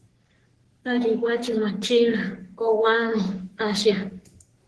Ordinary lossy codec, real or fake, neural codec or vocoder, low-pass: Opus, 16 kbps; fake; codec, 44.1 kHz, 3.4 kbps, Pupu-Codec; 10.8 kHz